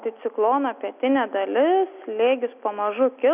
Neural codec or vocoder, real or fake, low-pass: none; real; 3.6 kHz